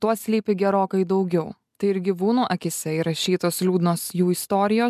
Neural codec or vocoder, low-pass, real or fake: none; 14.4 kHz; real